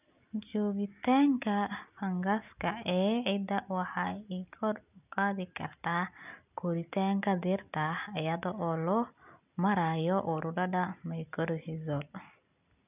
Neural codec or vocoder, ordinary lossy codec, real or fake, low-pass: none; none; real; 3.6 kHz